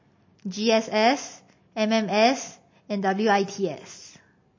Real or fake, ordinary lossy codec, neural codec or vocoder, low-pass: real; MP3, 32 kbps; none; 7.2 kHz